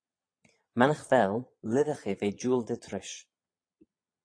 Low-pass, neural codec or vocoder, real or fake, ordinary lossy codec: 9.9 kHz; vocoder, 22.05 kHz, 80 mel bands, Vocos; fake; AAC, 48 kbps